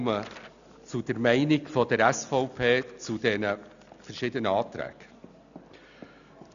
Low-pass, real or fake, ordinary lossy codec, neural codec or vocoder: 7.2 kHz; real; none; none